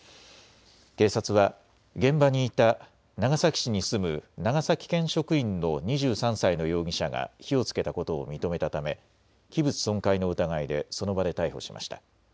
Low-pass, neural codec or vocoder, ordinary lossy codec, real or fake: none; none; none; real